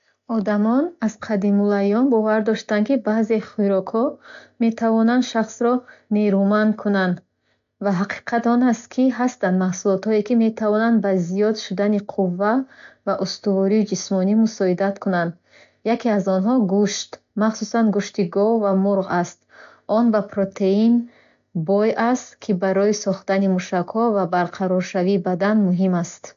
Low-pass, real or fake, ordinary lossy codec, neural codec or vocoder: 7.2 kHz; real; AAC, 64 kbps; none